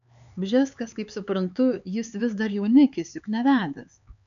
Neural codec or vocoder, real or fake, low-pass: codec, 16 kHz, 4 kbps, X-Codec, HuBERT features, trained on LibriSpeech; fake; 7.2 kHz